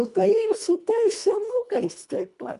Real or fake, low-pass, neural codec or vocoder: fake; 10.8 kHz; codec, 24 kHz, 1.5 kbps, HILCodec